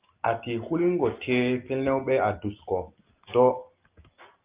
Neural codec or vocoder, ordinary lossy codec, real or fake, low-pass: none; Opus, 24 kbps; real; 3.6 kHz